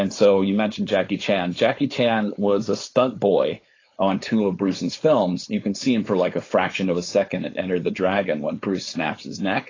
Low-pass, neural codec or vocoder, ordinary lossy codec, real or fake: 7.2 kHz; codec, 16 kHz, 4.8 kbps, FACodec; AAC, 32 kbps; fake